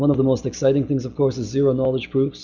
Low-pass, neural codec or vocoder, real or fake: 7.2 kHz; none; real